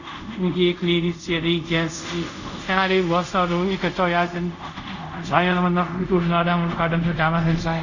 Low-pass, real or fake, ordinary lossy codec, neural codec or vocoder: 7.2 kHz; fake; none; codec, 24 kHz, 0.5 kbps, DualCodec